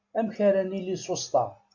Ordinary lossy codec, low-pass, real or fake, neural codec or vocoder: MP3, 64 kbps; 7.2 kHz; real; none